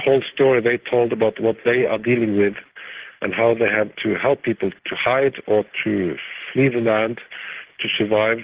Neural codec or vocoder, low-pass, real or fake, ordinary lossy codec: none; 3.6 kHz; real; Opus, 16 kbps